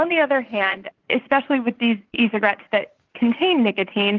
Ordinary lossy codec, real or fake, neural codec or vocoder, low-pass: Opus, 24 kbps; fake; vocoder, 44.1 kHz, 80 mel bands, Vocos; 7.2 kHz